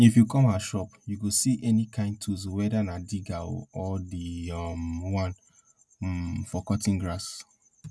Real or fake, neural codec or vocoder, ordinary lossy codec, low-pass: real; none; none; none